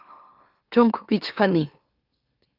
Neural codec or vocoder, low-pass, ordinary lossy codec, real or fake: autoencoder, 44.1 kHz, a latent of 192 numbers a frame, MeloTTS; 5.4 kHz; Opus, 24 kbps; fake